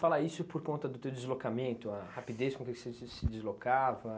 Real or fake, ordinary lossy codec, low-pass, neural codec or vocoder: real; none; none; none